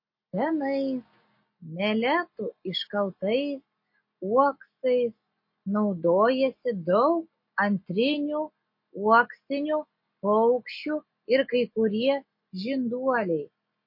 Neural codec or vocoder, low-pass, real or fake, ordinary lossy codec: none; 5.4 kHz; real; MP3, 32 kbps